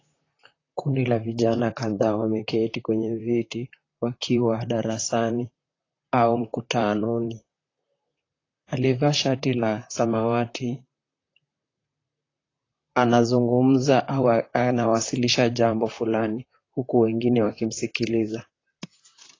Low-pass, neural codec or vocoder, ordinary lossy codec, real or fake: 7.2 kHz; vocoder, 44.1 kHz, 128 mel bands, Pupu-Vocoder; AAC, 32 kbps; fake